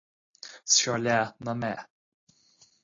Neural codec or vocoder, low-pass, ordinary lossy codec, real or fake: none; 7.2 kHz; AAC, 32 kbps; real